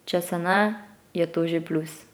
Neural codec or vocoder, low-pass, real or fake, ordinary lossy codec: vocoder, 44.1 kHz, 128 mel bands every 512 samples, BigVGAN v2; none; fake; none